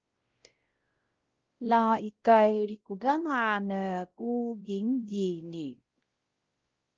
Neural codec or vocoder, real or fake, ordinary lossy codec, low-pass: codec, 16 kHz, 0.5 kbps, X-Codec, WavLM features, trained on Multilingual LibriSpeech; fake; Opus, 16 kbps; 7.2 kHz